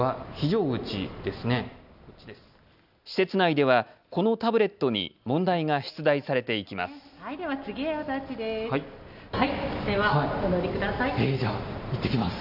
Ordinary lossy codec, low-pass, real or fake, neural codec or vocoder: none; 5.4 kHz; real; none